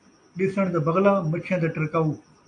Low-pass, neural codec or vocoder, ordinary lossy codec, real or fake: 9.9 kHz; none; AAC, 48 kbps; real